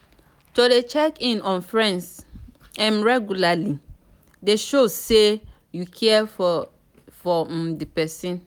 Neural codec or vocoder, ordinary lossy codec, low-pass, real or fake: none; none; none; real